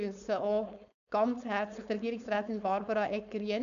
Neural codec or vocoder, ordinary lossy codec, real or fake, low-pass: codec, 16 kHz, 4.8 kbps, FACodec; none; fake; 7.2 kHz